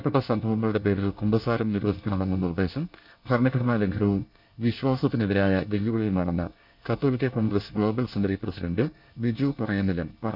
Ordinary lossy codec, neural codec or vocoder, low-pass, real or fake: none; codec, 24 kHz, 1 kbps, SNAC; 5.4 kHz; fake